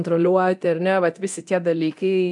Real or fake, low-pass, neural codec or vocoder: fake; 10.8 kHz; codec, 24 kHz, 0.9 kbps, DualCodec